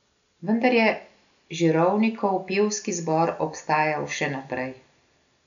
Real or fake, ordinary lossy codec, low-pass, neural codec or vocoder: real; none; 7.2 kHz; none